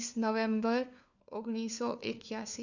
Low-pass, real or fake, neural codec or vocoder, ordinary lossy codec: 7.2 kHz; fake; codec, 16 kHz, 4 kbps, FunCodec, trained on LibriTTS, 50 frames a second; none